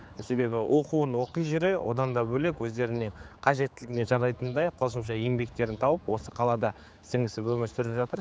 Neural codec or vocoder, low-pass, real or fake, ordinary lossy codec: codec, 16 kHz, 4 kbps, X-Codec, HuBERT features, trained on general audio; none; fake; none